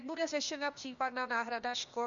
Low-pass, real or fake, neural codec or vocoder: 7.2 kHz; fake; codec, 16 kHz, 0.8 kbps, ZipCodec